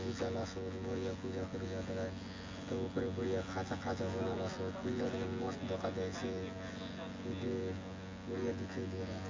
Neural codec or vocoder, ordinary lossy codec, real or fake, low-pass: vocoder, 24 kHz, 100 mel bands, Vocos; MP3, 48 kbps; fake; 7.2 kHz